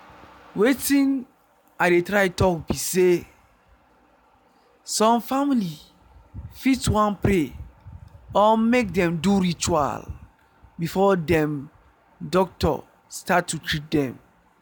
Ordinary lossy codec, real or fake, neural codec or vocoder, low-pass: none; real; none; none